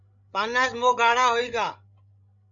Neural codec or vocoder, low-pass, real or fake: codec, 16 kHz, 16 kbps, FreqCodec, larger model; 7.2 kHz; fake